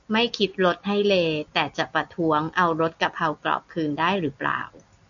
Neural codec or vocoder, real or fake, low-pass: none; real; 7.2 kHz